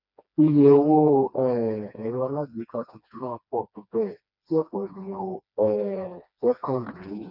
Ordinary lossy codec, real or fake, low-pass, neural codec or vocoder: none; fake; 5.4 kHz; codec, 16 kHz, 2 kbps, FreqCodec, smaller model